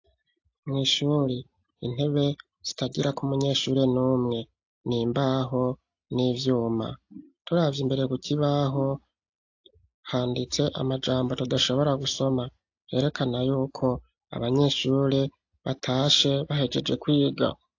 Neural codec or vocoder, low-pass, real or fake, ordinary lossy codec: none; 7.2 kHz; real; AAC, 48 kbps